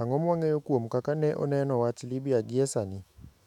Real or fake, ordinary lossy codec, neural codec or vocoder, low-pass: fake; none; autoencoder, 48 kHz, 128 numbers a frame, DAC-VAE, trained on Japanese speech; 19.8 kHz